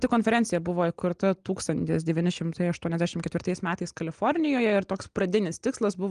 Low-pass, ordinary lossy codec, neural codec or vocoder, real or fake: 9.9 kHz; Opus, 24 kbps; none; real